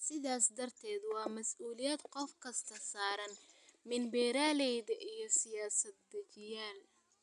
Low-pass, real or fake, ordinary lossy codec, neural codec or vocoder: 10.8 kHz; real; none; none